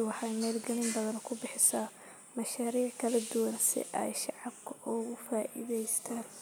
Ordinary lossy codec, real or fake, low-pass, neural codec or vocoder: none; real; none; none